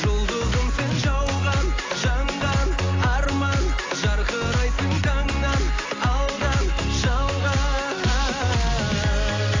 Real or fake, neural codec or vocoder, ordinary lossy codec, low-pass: real; none; AAC, 32 kbps; 7.2 kHz